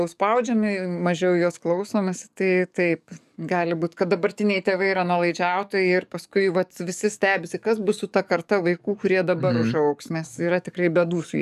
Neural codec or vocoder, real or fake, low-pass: codec, 44.1 kHz, 7.8 kbps, DAC; fake; 14.4 kHz